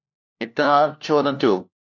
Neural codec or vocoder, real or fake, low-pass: codec, 16 kHz, 1 kbps, FunCodec, trained on LibriTTS, 50 frames a second; fake; 7.2 kHz